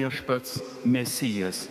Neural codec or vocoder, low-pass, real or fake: codec, 32 kHz, 1.9 kbps, SNAC; 14.4 kHz; fake